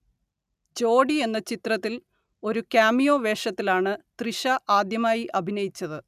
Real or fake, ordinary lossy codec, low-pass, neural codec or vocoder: real; none; 14.4 kHz; none